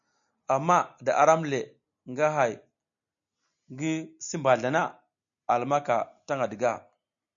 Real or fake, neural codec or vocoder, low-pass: real; none; 7.2 kHz